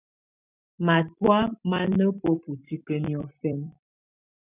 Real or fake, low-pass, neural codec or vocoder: fake; 3.6 kHz; vocoder, 44.1 kHz, 128 mel bands every 256 samples, BigVGAN v2